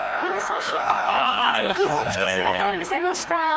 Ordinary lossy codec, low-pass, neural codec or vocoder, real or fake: none; none; codec, 16 kHz, 1 kbps, FreqCodec, larger model; fake